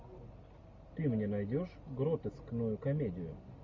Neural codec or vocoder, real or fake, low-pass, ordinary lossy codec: none; real; 7.2 kHz; MP3, 64 kbps